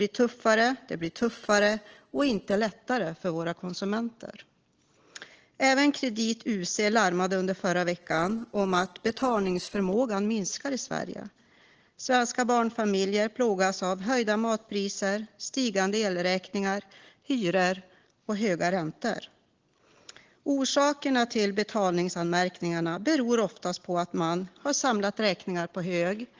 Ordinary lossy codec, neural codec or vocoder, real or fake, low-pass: Opus, 16 kbps; none; real; 7.2 kHz